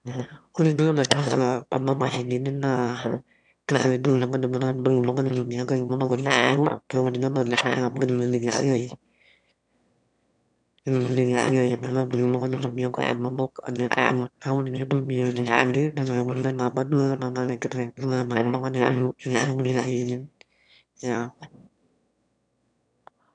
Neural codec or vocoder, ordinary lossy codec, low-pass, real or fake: autoencoder, 22.05 kHz, a latent of 192 numbers a frame, VITS, trained on one speaker; none; 9.9 kHz; fake